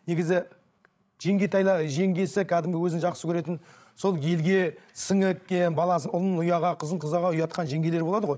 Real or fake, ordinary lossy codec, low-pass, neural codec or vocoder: fake; none; none; codec, 16 kHz, 8 kbps, FreqCodec, larger model